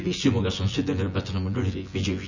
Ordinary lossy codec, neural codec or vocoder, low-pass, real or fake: none; vocoder, 24 kHz, 100 mel bands, Vocos; 7.2 kHz; fake